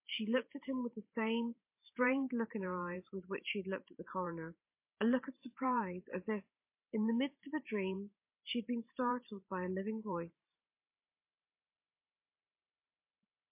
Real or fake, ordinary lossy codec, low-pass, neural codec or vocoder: fake; MP3, 24 kbps; 3.6 kHz; vocoder, 44.1 kHz, 128 mel bands every 512 samples, BigVGAN v2